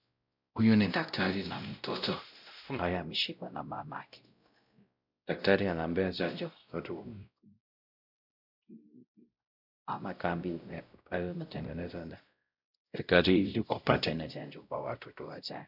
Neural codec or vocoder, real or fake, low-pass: codec, 16 kHz, 0.5 kbps, X-Codec, WavLM features, trained on Multilingual LibriSpeech; fake; 5.4 kHz